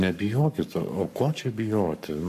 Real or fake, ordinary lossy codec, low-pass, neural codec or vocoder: fake; AAC, 96 kbps; 14.4 kHz; codec, 44.1 kHz, 7.8 kbps, Pupu-Codec